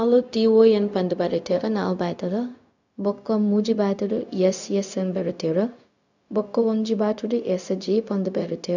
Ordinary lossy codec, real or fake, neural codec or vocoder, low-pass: none; fake; codec, 16 kHz, 0.4 kbps, LongCat-Audio-Codec; 7.2 kHz